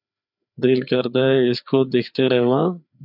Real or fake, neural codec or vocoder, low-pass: fake; codec, 16 kHz, 4 kbps, FreqCodec, larger model; 5.4 kHz